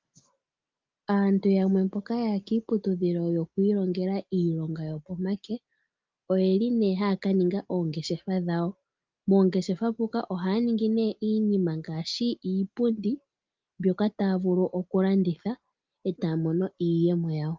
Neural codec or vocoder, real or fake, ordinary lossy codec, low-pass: none; real; Opus, 24 kbps; 7.2 kHz